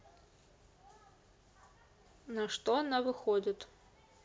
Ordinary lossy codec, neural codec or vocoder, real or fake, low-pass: none; none; real; none